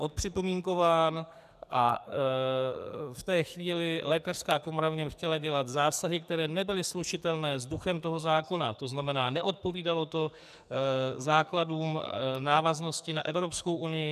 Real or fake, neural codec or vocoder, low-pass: fake; codec, 44.1 kHz, 2.6 kbps, SNAC; 14.4 kHz